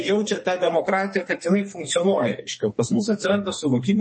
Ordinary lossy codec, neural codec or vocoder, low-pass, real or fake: MP3, 32 kbps; codec, 32 kHz, 1.9 kbps, SNAC; 9.9 kHz; fake